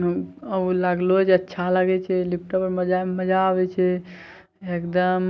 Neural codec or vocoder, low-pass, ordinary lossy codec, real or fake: none; none; none; real